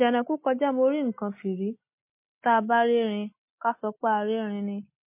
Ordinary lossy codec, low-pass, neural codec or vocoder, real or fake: MP3, 24 kbps; 3.6 kHz; none; real